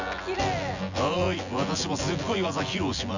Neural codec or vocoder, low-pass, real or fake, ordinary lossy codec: vocoder, 24 kHz, 100 mel bands, Vocos; 7.2 kHz; fake; none